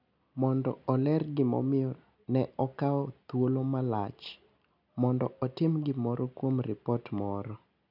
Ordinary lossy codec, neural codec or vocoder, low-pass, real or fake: none; none; 5.4 kHz; real